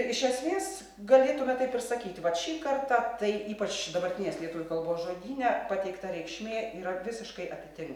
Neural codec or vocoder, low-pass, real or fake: none; 19.8 kHz; real